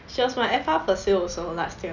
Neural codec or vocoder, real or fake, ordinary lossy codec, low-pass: none; real; none; 7.2 kHz